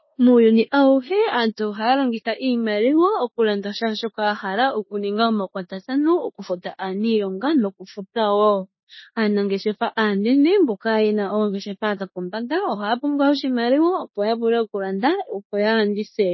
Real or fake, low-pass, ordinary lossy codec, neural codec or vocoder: fake; 7.2 kHz; MP3, 24 kbps; codec, 16 kHz in and 24 kHz out, 0.9 kbps, LongCat-Audio-Codec, four codebook decoder